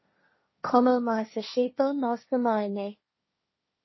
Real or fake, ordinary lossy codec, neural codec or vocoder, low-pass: fake; MP3, 24 kbps; codec, 16 kHz, 1.1 kbps, Voila-Tokenizer; 7.2 kHz